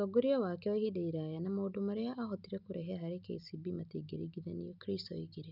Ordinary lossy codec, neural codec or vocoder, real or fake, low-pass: none; none; real; 5.4 kHz